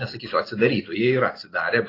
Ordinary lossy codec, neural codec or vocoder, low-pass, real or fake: AAC, 24 kbps; none; 5.4 kHz; real